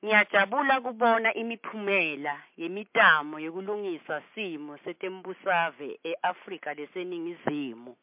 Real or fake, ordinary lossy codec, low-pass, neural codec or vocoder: real; MP3, 32 kbps; 3.6 kHz; none